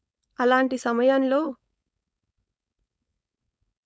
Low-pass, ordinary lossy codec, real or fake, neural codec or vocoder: none; none; fake; codec, 16 kHz, 4.8 kbps, FACodec